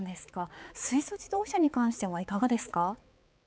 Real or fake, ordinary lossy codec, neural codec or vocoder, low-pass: fake; none; codec, 16 kHz, 4 kbps, X-Codec, HuBERT features, trained on balanced general audio; none